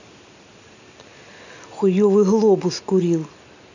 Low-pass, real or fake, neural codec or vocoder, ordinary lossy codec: 7.2 kHz; real; none; none